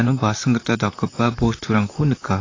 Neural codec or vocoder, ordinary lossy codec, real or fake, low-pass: vocoder, 44.1 kHz, 128 mel bands, Pupu-Vocoder; AAC, 32 kbps; fake; 7.2 kHz